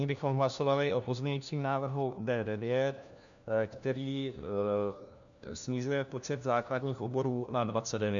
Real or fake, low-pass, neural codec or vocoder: fake; 7.2 kHz; codec, 16 kHz, 1 kbps, FunCodec, trained on LibriTTS, 50 frames a second